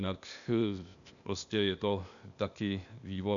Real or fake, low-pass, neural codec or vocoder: fake; 7.2 kHz; codec, 16 kHz, 0.8 kbps, ZipCodec